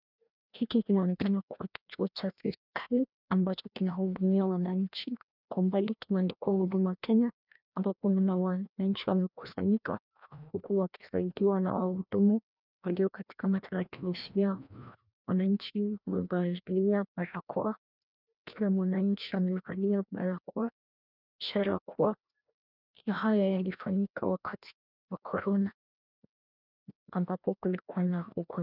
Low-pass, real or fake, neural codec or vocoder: 5.4 kHz; fake; codec, 16 kHz, 1 kbps, FreqCodec, larger model